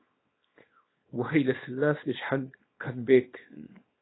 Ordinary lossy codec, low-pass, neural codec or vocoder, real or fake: AAC, 16 kbps; 7.2 kHz; codec, 24 kHz, 0.9 kbps, WavTokenizer, small release; fake